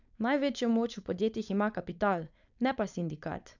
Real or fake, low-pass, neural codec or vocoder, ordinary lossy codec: fake; 7.2 kHz; codec, 16 kHz, 4.8 kbps, FACodec; none